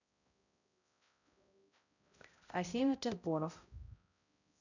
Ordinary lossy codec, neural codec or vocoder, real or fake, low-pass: none; codec, 16 kHz, 0.5 kbps, X-Codec, HuBERT features, trained on balanced general audio; fake; 7.2 kHz